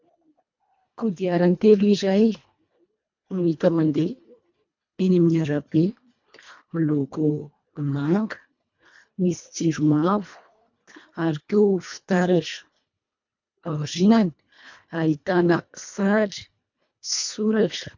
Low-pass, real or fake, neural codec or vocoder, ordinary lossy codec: 7.2 kHz; fake; codec, 24 kHz, 1.5 kbps, HILCodec; MP3, 64 kbps